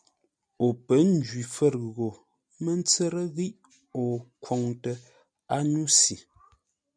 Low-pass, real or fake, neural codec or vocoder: 9.9 kHz; real; none